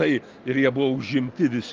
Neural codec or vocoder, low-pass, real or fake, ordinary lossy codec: none; 7.2 kHz; real; Opus, 24 kbps